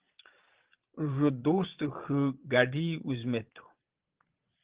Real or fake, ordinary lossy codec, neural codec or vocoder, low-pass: fake; Opus, 16 kbps; vocoder, 22.05 kHz, 80 mel bands, Vocos; 3.6 kHz